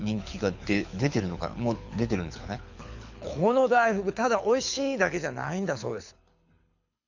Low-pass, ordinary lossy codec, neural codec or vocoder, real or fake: 7.2 kHz; none; codec, 24 kHz, 6 kbps, HILCodec; fake